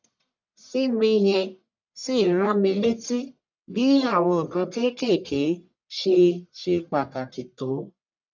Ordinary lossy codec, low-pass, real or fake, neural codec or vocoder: none; 7.2 kHz; fake; codec, 44.1 kHz, 1.7 kbps, Pupu-Codec